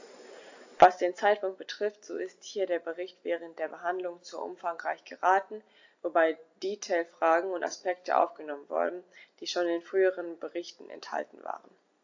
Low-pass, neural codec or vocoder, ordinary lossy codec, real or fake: 7.2 kHz; none; AAC, 48 kbps; real